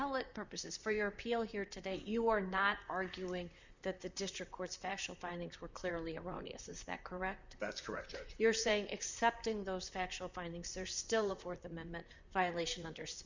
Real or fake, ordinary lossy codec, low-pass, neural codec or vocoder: fake; Opus, 64 kbps; 7.2 kHz; vocoder, 44.1 kHz, 128 mel bands, Pupu-Vocoder